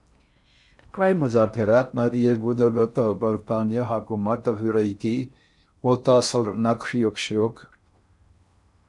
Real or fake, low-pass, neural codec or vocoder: fake; 10.8 kHz; codec, 16 kHz in and 24 kHz out, 0.6 kbps, FocalCodec, streaming, 4096 codes